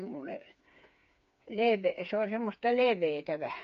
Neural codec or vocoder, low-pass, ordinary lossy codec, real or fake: codec, 16 kHz, 4 kbps, FreqCodec, smaller model; 7.2 kHz; MP3, 48 kbps; fake